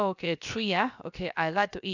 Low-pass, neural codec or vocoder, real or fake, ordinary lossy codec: 7.2 kHz; codec, 16 kHz, about 1 kbps, DyCAST, with the encoder's durations; fake; none